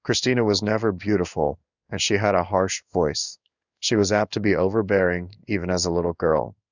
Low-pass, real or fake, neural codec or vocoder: 7.2 kHz; fake; codec, 16 kHz in and 24 kHz out, 1 kbps, XY-Tokenizer